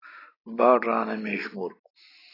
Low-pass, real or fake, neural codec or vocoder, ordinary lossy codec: 5.4 kHz; real; none; AAC, 24 kbps